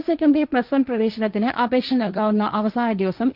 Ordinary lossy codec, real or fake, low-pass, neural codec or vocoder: Opus, 24 kbps; fake; 5.4 kHz; codec, 16 kHz, 1.1 kbps, Voila-Tokenizer